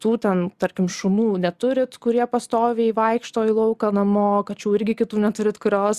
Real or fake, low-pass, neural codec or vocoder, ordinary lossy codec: real; 14.4 kHz; none; Opus, 64 kbps